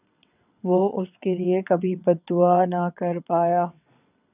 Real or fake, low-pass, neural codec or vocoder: fake; 3.6 kHz; vocoder, 22.05 kHz, 80 mel bands, WaveNeXt